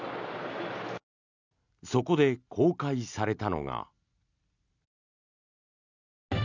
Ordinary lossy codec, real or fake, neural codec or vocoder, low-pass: none; real; none; 7.2 kHz